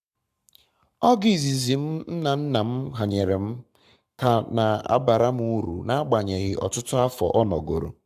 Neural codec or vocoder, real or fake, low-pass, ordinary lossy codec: codec, 44.1 kHz, 7.8 kbps, Pupu-Codec; fake; 14.4 kHz; AAC, 96 kbps